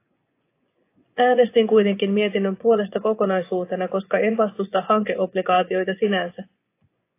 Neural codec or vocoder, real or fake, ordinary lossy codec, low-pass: vocoder, 24 kHz, 100 mel bands, Vocos; fake; AAC, 24 kbps; 3.6 kHz